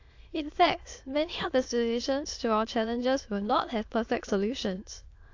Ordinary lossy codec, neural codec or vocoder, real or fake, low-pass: AAC, 48 kbps; autoencoder, 22.05 kHz, a latent of 192 numbers a frame, VITS, trained on many speakers; fake; 7.2 kHz